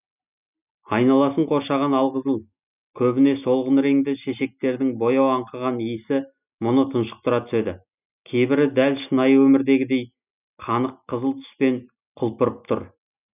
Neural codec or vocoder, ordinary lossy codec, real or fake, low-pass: none; none; real; 3.6 kHz